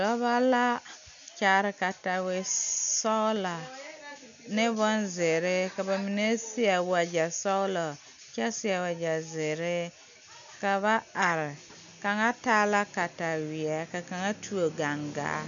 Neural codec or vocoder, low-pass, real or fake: none; 7.2 kHz; real